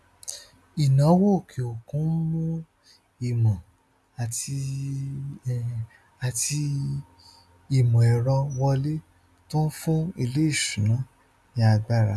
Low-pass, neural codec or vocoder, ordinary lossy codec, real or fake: none; none; none; real